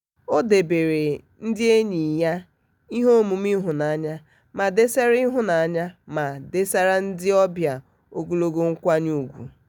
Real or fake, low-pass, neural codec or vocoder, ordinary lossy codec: real; 19.8 kHz; none; none